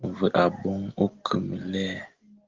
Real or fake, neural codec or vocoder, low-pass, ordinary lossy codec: real; none; 7.2 kHz; Opus, 16 kbps